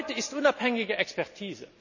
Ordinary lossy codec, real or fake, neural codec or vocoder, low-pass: none; real; none; 7.2 kHz